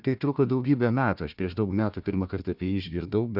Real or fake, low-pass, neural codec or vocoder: fake; 5.4 kHz; codec, 16 kHz, 1 kbps, FunCodec, trained on Chinese and English, 50 frames a second